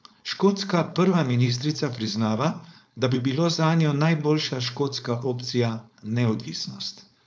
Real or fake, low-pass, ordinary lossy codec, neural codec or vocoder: fake; none; none; codec, 16 kHz, 4.8 kbps, FACodec